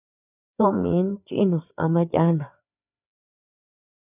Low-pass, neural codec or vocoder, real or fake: 3.6 kHz; codec, 16 kHz, 4 kbps, FreqCodec, larger model; fake